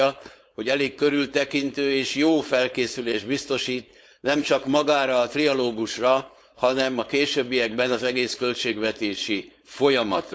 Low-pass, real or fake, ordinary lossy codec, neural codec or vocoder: none; fake; none; codec, 16 kHz, 4.8 kbps, FACodec